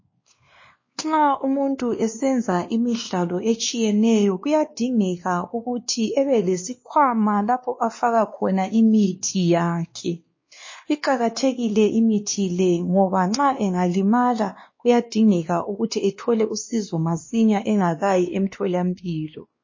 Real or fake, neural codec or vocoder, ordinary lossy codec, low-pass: fake; codec, 16 kHz, 2 kbps, X-Codec, WavLM features, trained on Multilingual LibriSpeech; MP3, 32 kbps; 7.2 kHz